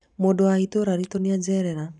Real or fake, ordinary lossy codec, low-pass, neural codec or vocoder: real; none; 10.8 kHz; none